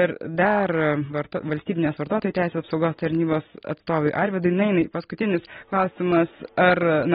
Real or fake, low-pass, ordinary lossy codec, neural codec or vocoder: real; 19.8 kHz; AAC, 16 kbps; none